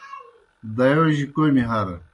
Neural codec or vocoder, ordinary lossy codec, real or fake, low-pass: none; MP3, 48 kbps; real; 10.8 kHz